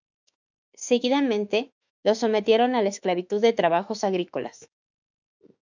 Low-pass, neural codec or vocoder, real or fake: 7.2 kHz; autoencoder, 48 kHz, 32 numbers a frame, DAC-VAE, trained on Japanese speech; fake